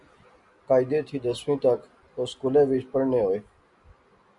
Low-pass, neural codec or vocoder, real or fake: 10.8 kHz; none; real